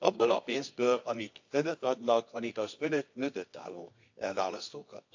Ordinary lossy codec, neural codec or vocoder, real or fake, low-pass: AAC, 48 kbps; codec, 24 kHz, 0.9 kbps, WavTokenizer, medium music audio release; fake; 7.2 kHz